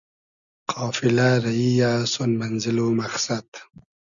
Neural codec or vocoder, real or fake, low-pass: none; real; 7.2 kHz